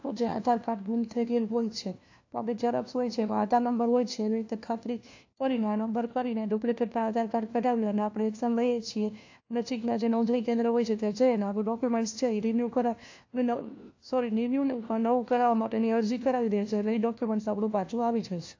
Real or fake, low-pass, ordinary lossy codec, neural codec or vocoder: fake; 7.2 kHz; AAC, 48 kbps; codec, 16 kHz, 1 kbps, FunCodec, trained on LibriTTS, 50 frames a second